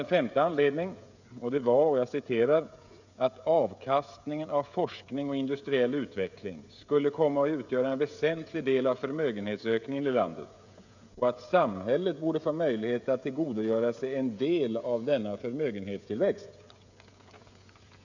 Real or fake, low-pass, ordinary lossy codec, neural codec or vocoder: fake; 7.2 kHz; none; codec, 16 kHz, 16 kbps, FreqCodec, smaller model